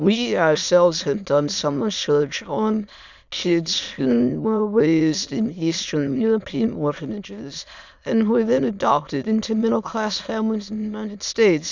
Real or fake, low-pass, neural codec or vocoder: fake; 7.2 kHz; autoencoder, 22.05 kHz, a latent of 192 numbers a frame, VITS, trained on many speakers